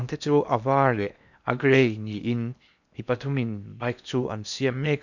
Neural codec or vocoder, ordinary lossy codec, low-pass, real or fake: codec, 16 kHz in and 24 kHz out, 0.8 kbps, FocalCodec, streaming, 65536 codes; none; 7.2 kHz; fake